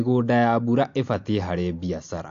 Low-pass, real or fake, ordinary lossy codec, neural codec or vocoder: 7.2 kHz; real; AAC, 48 kbps; none